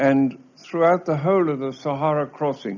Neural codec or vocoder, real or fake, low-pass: none; real; 7.2 kHz